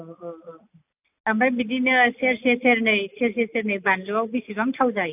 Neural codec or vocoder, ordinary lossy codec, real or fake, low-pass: none; AAC, 32 kbps; real; 3.6 kHz